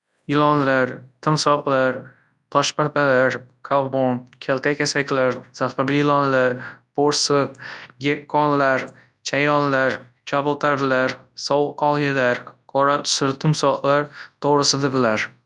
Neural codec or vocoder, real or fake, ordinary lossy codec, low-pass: codec, 24 kHz, 0.9 kbps, WavTokenizer, large speech release; fake; none; 10.8 kHz